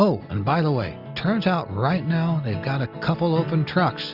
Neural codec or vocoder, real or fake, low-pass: none; real; 5.4 kHz